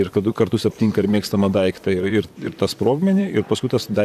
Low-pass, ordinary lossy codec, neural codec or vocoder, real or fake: 14.4 kHz; AAC, 96 kbps; vocoder, 44.1 kHz, 128 mel bands, Pupu-Vocoder; fake